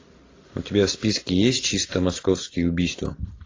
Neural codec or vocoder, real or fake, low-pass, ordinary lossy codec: none; real; 7.2 kHz; AAC, 32 kbps